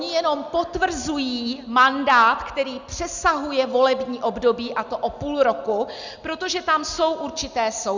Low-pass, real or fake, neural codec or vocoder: 7.2 kHz; real; none